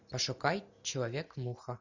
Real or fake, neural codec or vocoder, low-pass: real; none; 7.2 kHz